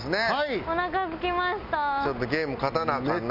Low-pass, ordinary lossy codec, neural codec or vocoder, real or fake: 5.4 kHz; none; none; real